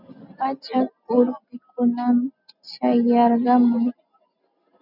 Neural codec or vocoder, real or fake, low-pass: none; real; 5.4 kHz